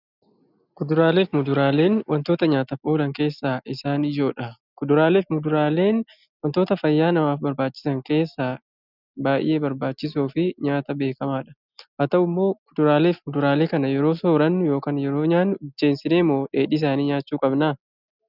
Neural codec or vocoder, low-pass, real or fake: none; 5.4 kHz; real